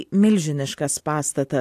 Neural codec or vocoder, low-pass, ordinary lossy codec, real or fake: none; 14.4 kHz; AAC, 64 kbps; real